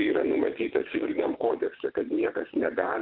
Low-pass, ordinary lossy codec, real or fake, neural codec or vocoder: 5.4 kHz; Opus, 16 kbps; fake; vocoder, 22.05 kHz, 80 mel bands, WaveNeXt